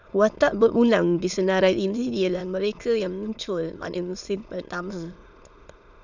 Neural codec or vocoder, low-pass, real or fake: autoencoder, 22.05 kHz, a latent of 192 numbers a frame, VITS, trained on many speakers; 7.2 kHz; fake